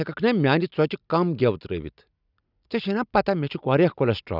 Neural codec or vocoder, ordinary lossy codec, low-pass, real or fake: none; none; 5.4 kHz; real